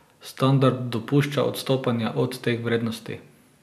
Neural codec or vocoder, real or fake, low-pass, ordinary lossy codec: none; real; 14.4 kHz; none